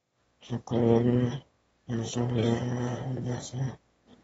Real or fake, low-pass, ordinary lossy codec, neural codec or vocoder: fake; 9.9 kHz; AAC, 24 kbps; autoencoder, 22.05 kHz, a latent of 192 numbers a frame, VITS, trained on one speaker